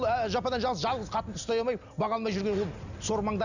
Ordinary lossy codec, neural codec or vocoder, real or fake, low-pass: none; none; real; 7.2 kHz